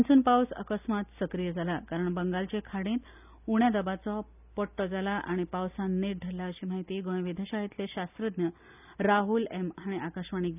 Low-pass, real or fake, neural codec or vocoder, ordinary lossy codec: 3.6 kHz; real; none; none